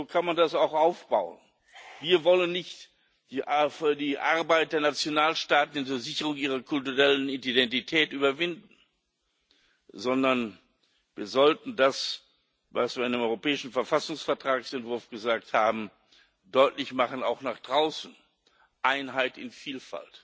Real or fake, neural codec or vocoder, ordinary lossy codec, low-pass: real; none; none; none